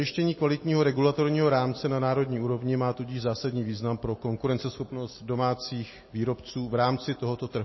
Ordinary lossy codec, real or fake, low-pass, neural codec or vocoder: MP3, 24 kbps; real; 7.2 kHz; none